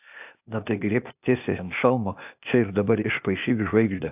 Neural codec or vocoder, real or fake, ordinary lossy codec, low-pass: codec, 16 kHz, 0.8 kbps, ZipCodec; fake; AAC, 32 kbps; 3.6 kHz